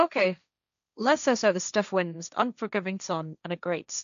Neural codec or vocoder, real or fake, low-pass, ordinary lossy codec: codec, 16 kHz, 1.1 kbps, Voila-Tokenizer; fake; 7.2 kHz; none